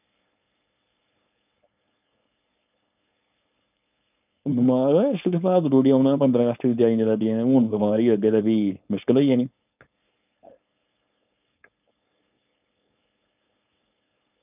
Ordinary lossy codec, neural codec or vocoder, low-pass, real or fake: none; codec, 16 kHz, 4.8 kbps, FACodec; 3.6 kHz; fake